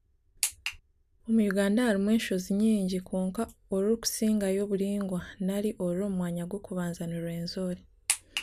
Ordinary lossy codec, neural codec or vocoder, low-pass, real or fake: none; none; 14.4 kHz; real